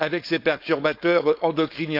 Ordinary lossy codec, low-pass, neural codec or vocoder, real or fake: AAC, 48 kbps; 5.4 kHz; codec, 16 kHz, 4.8 kbps, FACodec; fake